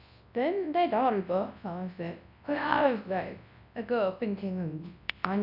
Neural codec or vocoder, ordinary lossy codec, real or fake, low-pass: codec, 24 kHz, 0.9 kbps, WavTokenizer, large speech release; none; fake; 5.4 kHz